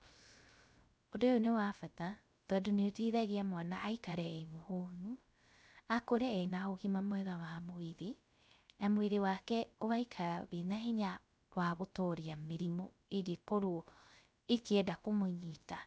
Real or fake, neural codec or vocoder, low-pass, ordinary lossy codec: fake; codec, 16 kHz, 0.3 kbps, FocalCodec; none; none